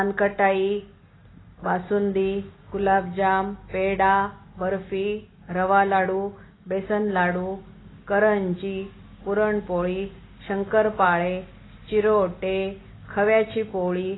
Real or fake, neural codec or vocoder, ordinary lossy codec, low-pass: real; none; AAC, 16 kbps; 7.2 kHz